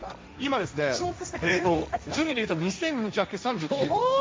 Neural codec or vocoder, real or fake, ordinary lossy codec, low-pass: codec, 16 kHz, 1.1 kbps, Voila-Tokenizer; fake; none; none